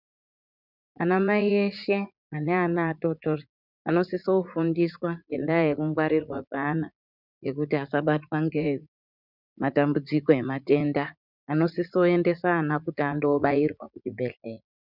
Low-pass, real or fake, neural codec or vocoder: 5.4 kHz; fake; vocoder, 44.1 kHz, 80 mel bands, Vocos